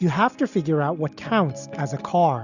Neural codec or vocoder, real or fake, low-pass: none; real; 7.2 kHz